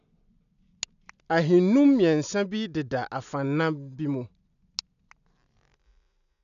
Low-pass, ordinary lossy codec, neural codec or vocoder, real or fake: 7.2 kHz; none; none; real